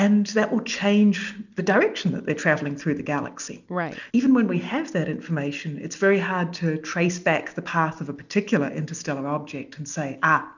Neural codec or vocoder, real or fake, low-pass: none; real; 7.2 kHz